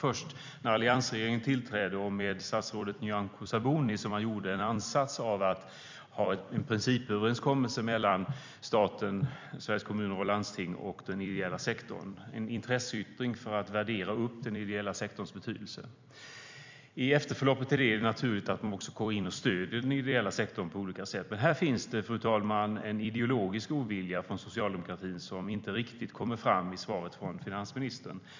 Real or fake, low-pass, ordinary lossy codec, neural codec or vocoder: fake; 7.2 kHz; MP3, 64 kbps; vocoder, 44.1 kHz, 128 mel bands every 256 samples, BigVGAN v2